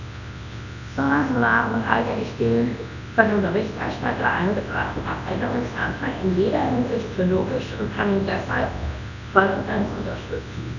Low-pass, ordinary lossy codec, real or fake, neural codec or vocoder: 7.2 kHz; none; fake; codec, 24 kHz, 0.9 kbps, WavTokenizer, large speech release